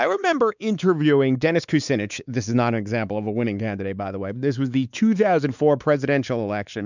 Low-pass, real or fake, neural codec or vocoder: 7.2 kHz; fake; codec, 16 kHz, 4 kbps, X-Codec, WavLM features, trained on Multilingual LibriSpeech